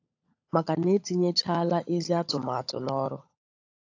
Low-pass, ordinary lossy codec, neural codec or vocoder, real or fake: 7.2 kHz; AAC, 48 kbps; codec, 16 kHz, 16 kbps, FunCodec, trained on LibriTTS, 50 frames a second; fake